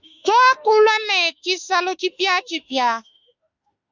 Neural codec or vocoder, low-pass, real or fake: autoencoder, 48 kHz, 32 numbers a frame, DAC-VAE, trained on Japanese speech; 7.2 kHz; fake